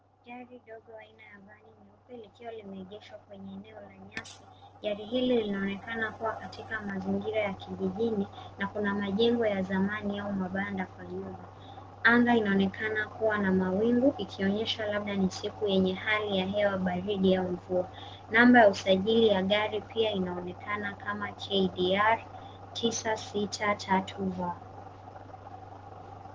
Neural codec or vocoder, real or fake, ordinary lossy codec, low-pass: none; real; Opus, 16 kbps; 7.2 kHz